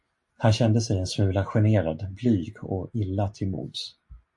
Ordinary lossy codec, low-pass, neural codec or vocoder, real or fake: MP3, 48 kbps; 10.8 kHz; none; real